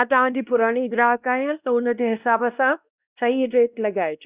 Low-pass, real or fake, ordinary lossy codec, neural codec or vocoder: 3.6 kHz; fake; Opus, 64 kbps; codec, 16 kHz, 1 kbps, X-Codec, WavLM features, trained on Multilingual LibriSpeech